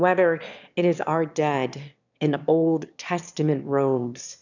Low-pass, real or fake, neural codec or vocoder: 7.2 kHz; fake; autoencoder, 22.05 kHz, a latent of 192 numbers a frame, VITS, trained on one speaker